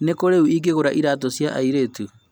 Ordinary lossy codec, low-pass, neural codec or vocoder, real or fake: none; none; none; real